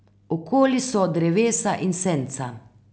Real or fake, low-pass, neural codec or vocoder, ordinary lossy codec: real; none; none; none